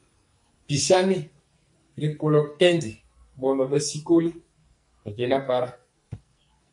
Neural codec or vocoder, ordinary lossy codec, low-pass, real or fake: codec, 44.1 kHz, 2.6 kbps, SNAC; MP3, 48 kbps; 10.8 kHz; fake